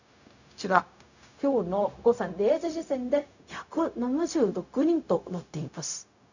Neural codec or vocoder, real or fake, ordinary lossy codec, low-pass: codec, 16 kHz, 0.4 kbps, LongCat-Audio-Codec; fake; none; 7.2 kHz